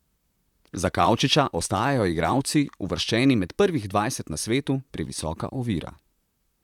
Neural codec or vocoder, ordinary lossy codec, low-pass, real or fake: vocoder, 44.1 kHz, 128 mel bands, Pupu-Vocoder; none; 19.8 kHz; fake